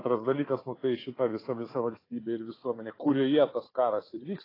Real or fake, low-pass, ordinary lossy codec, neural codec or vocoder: fake; 5.4 kHz; AAC, 24 kbps; codec, 16 kHz, 4 kbps, FunCodec, trained on Chinese and English, 50 frames a second